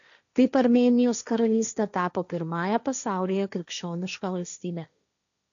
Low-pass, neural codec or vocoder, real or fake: 7.2 kHz; codec, 16 kHz, 1.1 kbps, Voila-Tokenizer; fake